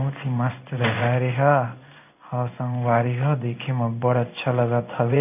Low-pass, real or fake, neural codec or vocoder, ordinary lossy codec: 3.6 kHz; fake; codec, 16 kHz in and 24 kHz out, 1 kbps, XY-Tokenizer; none